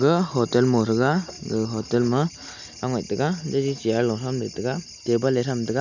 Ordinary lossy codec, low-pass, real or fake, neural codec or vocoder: none; 7.2 kHz; real; none